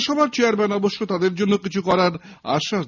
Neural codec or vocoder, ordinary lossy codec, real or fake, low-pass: none; none; real; 7.2 kHz